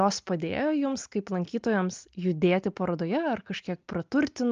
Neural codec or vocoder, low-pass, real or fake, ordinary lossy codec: none; 7.2 kHz; real; Opus, 24 kbps